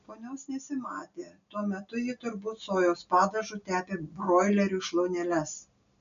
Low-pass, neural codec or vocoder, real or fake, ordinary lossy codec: 7.2 kHz; none; real; MP3, 96 kbps